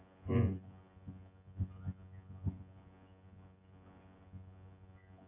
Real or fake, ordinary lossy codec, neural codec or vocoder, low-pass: fake; AAC, 24 kbps; vocoder, 24 kHz, 100 mel bands, Vocos; 3.6 kHz